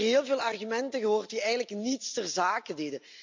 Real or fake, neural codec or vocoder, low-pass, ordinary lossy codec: real; none; 7.2 kHz; MP3, 64 kbps